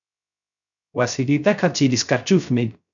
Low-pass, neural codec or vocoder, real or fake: 7.2 kHz; codec, 16 kHz, 0.3 kbps, FocalCodec; fake